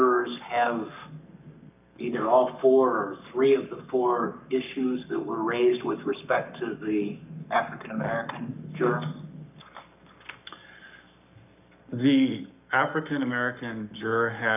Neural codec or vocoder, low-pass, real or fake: codec, 44.1 kHz, 7.8 kbps, Pupu-Codec; 3.6 kHz; fake